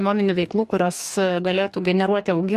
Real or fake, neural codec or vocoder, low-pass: fake; codec, 44.1 kHz, 2.6 kbps, DAC; 14.4 kHz